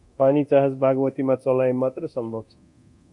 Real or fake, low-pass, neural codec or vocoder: fake; 10.8 kHz; codec, 24 kHz, 0.9 kbps, DualCodec